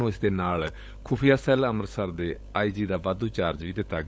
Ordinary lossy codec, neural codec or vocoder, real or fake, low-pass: none; codec, 16 kHz, 16 kbps, FunCodec, trained on LibriTTS, 50 frames a second; fake; none